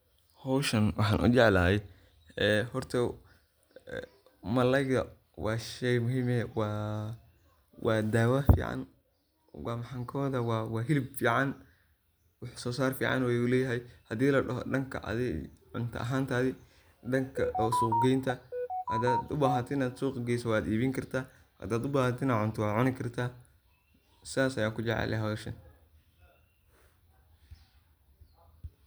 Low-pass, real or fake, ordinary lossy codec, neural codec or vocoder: none; real; none; none